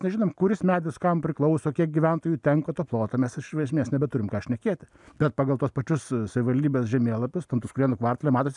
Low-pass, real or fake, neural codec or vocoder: 10.8 kHz; real; none